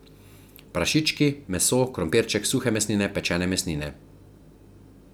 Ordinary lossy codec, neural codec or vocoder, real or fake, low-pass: none; none; real; none